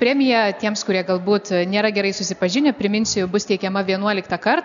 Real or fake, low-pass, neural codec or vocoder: real; 7.2 kHz; none